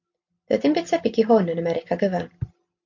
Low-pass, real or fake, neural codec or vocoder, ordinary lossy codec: 7.2 kHz; real; none; MP3, 48 kbps